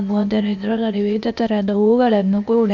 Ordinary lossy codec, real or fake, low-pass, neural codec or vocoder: none; fake; 7.2 kHz; codec, 16 kHz, 0.8 kbps, ZipCodec